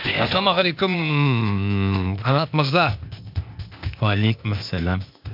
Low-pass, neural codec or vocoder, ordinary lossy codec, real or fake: 5.4 kHz; codec, 16 kHz, 0.8 kbps, ZipCodec; MP3, 48 kbps; fake